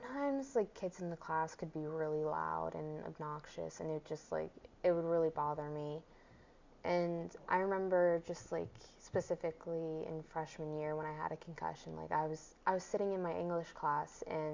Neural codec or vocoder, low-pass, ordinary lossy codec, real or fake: none; 7.2 kHz; MP3, 64 kbps; real